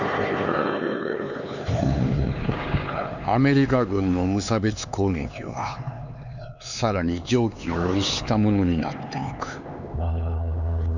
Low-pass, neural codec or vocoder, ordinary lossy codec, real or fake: 7.2 kHz; codec, 16 kHz, 4 kbps, X-Codec, HuBERT features, trained on LibriSpeech; none; fake